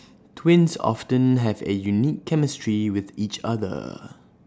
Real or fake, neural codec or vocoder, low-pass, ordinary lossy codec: real; none; none; none